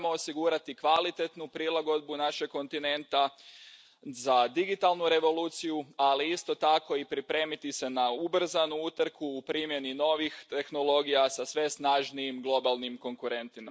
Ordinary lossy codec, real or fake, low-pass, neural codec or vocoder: none; real; none; none